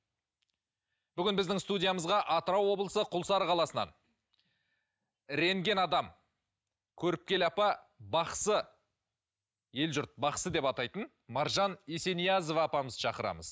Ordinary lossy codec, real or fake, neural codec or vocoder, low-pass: none; real; none; none